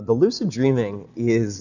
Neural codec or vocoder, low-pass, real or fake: none; 7.2 kHz; real